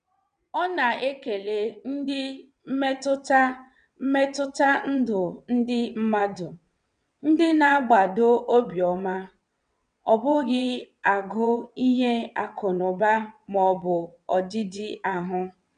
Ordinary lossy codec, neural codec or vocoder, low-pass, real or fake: none; vocoder, 22.05 kHz, 80 mel bands, WaveNeXt; 9.9 kHz; fake